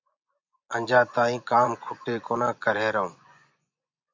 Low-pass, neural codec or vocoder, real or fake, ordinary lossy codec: 7.2 kHz; vocoder, 24 kHz, 100 mel bands, Vocos; fake; MP3, 64 kbps